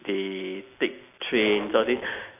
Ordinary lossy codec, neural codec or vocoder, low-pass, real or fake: none; none; 3.6 kHz; real